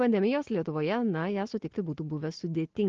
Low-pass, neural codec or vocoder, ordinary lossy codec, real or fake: 7.2 kHz; none; Opus, 16 kbps; real